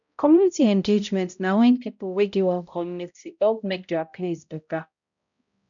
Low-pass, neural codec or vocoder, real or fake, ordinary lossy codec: 7.2 kHz; codec, 16 kHz, 0.5 kbps, X-Codec, HuBERT features, trained on balanced general audio; fake; none